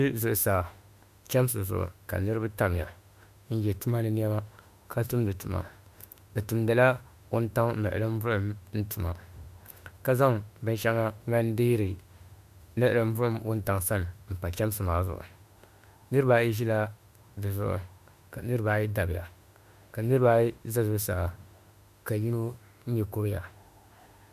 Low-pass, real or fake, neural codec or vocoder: 14.4 kHz; fake; autoencoder, 48 kHz, 32 numbers a frame, DAC-VAE, trained on Japanese speech